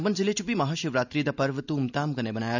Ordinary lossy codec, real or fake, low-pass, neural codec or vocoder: none; real; 7.2 kHz; none